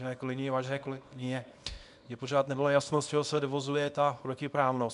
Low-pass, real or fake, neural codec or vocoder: 10.8 kHz; fake; codec, 24 kHz, 0.9 kbps, WavTokenizer, small release